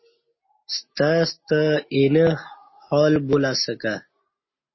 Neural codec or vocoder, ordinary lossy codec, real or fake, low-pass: none; MP3, 24 kbps; real; 7.2 kHz